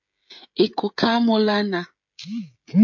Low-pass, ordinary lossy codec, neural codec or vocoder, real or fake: 7.2 kHz; MP3, 48 kbps; codec, 16 kHz, 16 kbps, FreqCodec, smaller model; fake